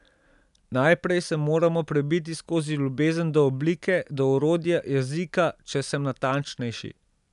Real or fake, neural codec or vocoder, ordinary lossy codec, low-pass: real; none; none; 10.8 kHz